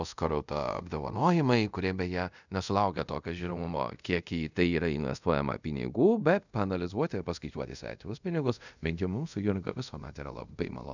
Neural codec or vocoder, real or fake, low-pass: codec, 24 kHz, 0.5 kbps, DualCodec; fake; 7.2 kHz